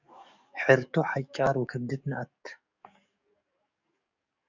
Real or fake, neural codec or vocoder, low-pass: fake; codec, 44.1 kHz, 7.8 kbps, DAC; 7.2 kHz